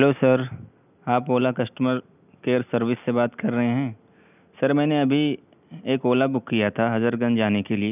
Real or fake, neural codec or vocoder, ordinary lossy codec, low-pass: real; none; none; 3.6 kHz